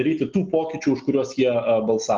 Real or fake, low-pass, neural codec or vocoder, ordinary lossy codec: real; 7.2 kHz; none; Opus, 24 kbps